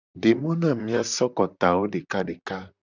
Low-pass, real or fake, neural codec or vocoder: 7.2 kHz; fake; vocoder, 44.1 kHz, 128 mel bands, Pupu-Vocoder